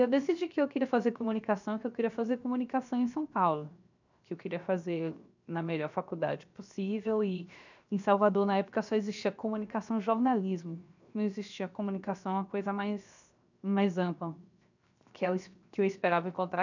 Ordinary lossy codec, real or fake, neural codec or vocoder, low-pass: none; fake; codec, 16 kHz, 0.7 kbps, FocalCodec; 7.2 kHz